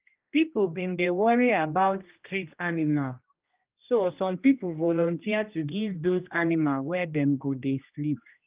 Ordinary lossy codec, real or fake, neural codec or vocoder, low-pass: Opus, 32 kbps; fake; codec, 16 kHz, 1 kbps, X-Codec, HuBERT features, trained on general audio; 3.6 kHz